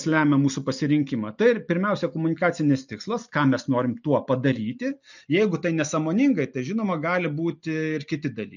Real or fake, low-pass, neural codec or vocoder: real; 7.2 kHz; none